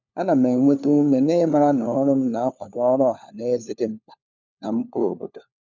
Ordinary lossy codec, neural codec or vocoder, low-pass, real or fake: none; codec, 16 kHz, 4 kbps, FunCodec, trained on LibriTTS, 50 frames a second; 7.2 kHz; fake